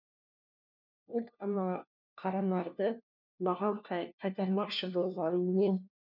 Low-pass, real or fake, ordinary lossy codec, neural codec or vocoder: 5.4 kHz; fake; none; codec, 16 kHz, 1 kbps, FunCodec, trained on LibriTTS, 50 frames a second